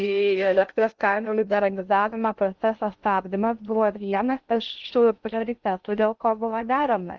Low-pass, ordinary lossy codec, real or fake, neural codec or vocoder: 7.2 kHz; Opus, 32 kbps; fake; codec, 16 kHz in and 24 kHz out, 0.6 kbps, FocalCodec, streaming, 2048 codes